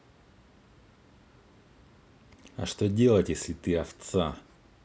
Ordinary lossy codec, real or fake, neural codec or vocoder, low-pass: none; real; none; none